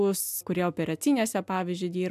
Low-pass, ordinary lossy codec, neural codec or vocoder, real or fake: 14.4 kHz; AAC, 96 kbps; none; real